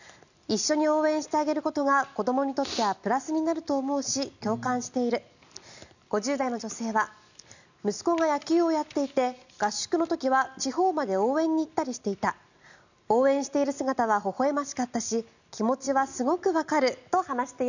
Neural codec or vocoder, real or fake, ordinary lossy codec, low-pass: none; real; none; 7.2 kHz